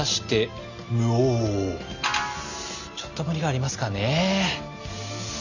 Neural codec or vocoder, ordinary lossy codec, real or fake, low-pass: none; none; real; 7.2 kHz